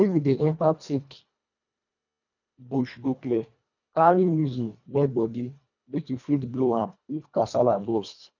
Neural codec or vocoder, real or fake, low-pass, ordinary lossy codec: codec, 24 kHz, 1.5 kbps, HILCodec; fake; 7.2 kHz; none